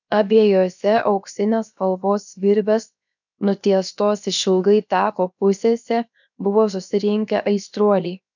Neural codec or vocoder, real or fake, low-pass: codec, 16 kHz, 0.7 kbps, FocalCodec; fake; 7.2 kHz